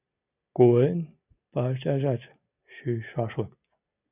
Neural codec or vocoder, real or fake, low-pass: none; real; 3.6 kHz